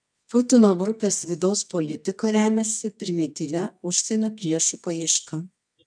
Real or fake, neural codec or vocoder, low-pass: fake; codec, 24 kHz, 0.9 kbps, WavTokenizer, medium music audio release; 9.9 kHz